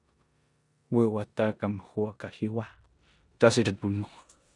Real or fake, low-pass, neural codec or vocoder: fake; 10.8 kHz; codec, 16 kHz in and 24 kHz out, 0.9 kbps, LongCat-Audio-Codec, four codebook decoder